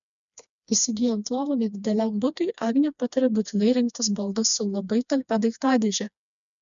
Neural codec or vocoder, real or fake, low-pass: codec, 16 kHz, 2 kbps, FreqCodec, smaller model; fake; 7.2 kHz